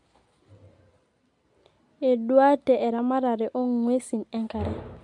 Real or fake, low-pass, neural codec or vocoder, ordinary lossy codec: real; 10.8 kHz; none; MP3, 64 kbps